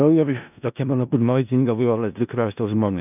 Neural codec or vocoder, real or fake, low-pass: codec, 16 kHz in and 24 kHz out, 0.4 kbps, LongCat-Audio-Codec, four codebook decoder; fake; 3.6 kHz